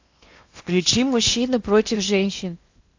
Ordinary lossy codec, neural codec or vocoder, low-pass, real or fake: AAC, 48 kbps; codec, 16 kHz in and 24 kHz out, 0.8 kbps, FocalCodec, streaming, 65536 codes; 7.2 kHz; fake